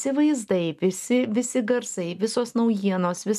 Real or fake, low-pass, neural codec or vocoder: real; 14.4 kHz; none